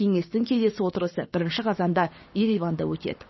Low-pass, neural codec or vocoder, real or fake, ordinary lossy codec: 7.2 kHz; codec, 16 kHz, 6 kbps, DAC; fake; MP3, 24 kbps